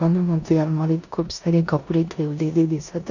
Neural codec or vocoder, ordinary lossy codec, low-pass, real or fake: codec, 16 kHz in and 24 kHz out, 0.9 kbps, LongCat-Audio-Codec, four codebook decoder; none; 7.2 kHz; fake